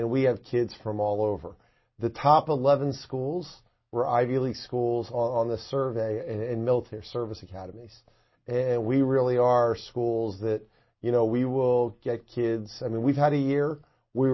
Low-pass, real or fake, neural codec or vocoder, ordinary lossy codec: 7.2 kHz; real; none; MP3, 24 kbps